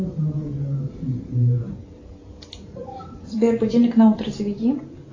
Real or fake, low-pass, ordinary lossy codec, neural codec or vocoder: fake; 7.2 kHz; AAC, 32 kbps; vocoder, 44.1 kHz, 128 mel bands every 512 samples, BigVGAN v2